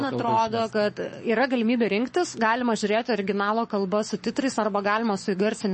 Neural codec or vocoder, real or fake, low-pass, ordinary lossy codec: codec, 44.1 kHz, 7.8 kbps, Pupu-Codec; fake; 10.8 kHz; MP3, 32 kbps